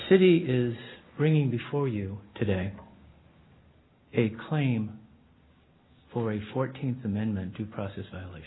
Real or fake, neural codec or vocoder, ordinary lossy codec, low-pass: real; none; AAC, 16 kbps; 7.2 kHz